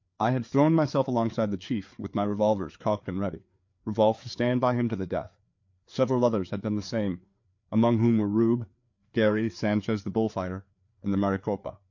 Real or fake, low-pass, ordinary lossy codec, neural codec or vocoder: fake; 7.2 kHz; MP3, 48 kbps; codec, 16 kHz, 4 kbps, FreqCodec, larger model